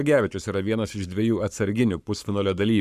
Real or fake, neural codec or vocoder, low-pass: fake; codec, 44.1 kHz, 7.8 kbps, Pupu-Codec; 14.4 kHz